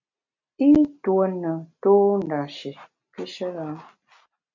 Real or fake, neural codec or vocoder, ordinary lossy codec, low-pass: real; none; MP3, 64 kbps; 7.2 kHz